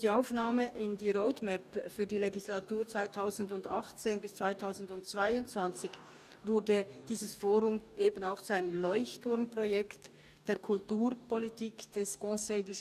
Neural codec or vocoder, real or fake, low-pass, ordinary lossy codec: codec, 44.1 kHz, 2.6 kbps, DAC; fake; 14.4 kHz; none